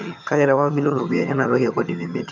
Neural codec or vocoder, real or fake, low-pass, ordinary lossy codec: vocoder, 22.05 kHz, 80 mel bands, HiFi-GAN; fake; 7.2 kHz; none